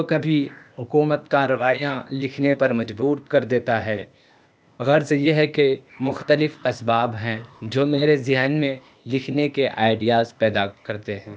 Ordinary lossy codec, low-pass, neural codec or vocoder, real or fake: none; none; codec, 16 kHz, 0.8 kbps, ZipCodec; fake